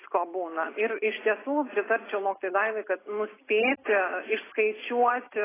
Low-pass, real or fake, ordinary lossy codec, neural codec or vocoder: 3.6 kHz; real; AAC, 16 kbps; none